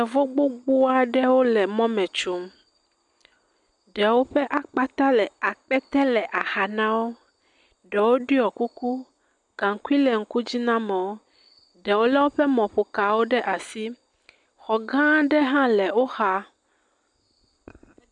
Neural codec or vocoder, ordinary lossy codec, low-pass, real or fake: none; MP3, 96 kbps; 10.8 kHz; real